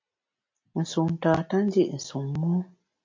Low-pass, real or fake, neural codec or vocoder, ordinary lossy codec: 7.2 kHz; real; none; MP3, 48 kbps